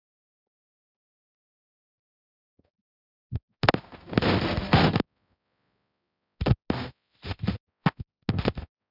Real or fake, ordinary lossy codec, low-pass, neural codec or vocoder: fake; none; 5.4 kHz; codec, 16 kHz, 0.5 kbps, X-Codec, HuBERT features, trained on balanced general audio